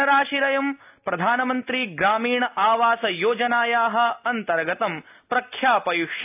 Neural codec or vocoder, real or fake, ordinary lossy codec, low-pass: none; real; AAC, 32 kbps; 3.6 kHz